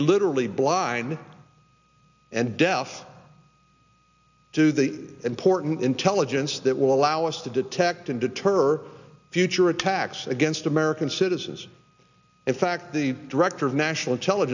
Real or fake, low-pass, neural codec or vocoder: real; 7.2 kHz; none